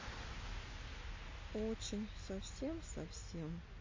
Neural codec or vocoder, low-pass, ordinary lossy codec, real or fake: none; 7.2 kHz; MP3, 32 kbps; real